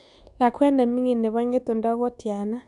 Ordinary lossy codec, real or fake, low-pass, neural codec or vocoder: none; fake; 10.8 kHz; codec, 24 kHz, 1.2 kbps, DualCodec